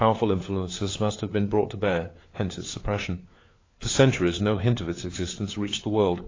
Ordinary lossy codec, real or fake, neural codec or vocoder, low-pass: AAC, 32 kbps; fake; codec, 16 kHz, 4 kbps, FunCodec, trained on LibriTTS, 50 frames a second; 7.2 kHz